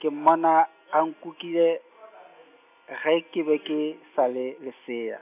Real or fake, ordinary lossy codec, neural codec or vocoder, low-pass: real; none; none; 3.6 kHz